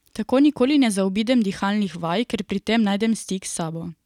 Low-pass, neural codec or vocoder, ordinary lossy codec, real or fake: 19.8 kHz; none; none; real